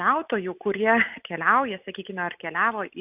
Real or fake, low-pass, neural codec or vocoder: real; 3.6 kHz; none